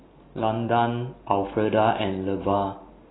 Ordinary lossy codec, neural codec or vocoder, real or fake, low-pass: AAC, 16 kbps; none; real; 7.2 kHz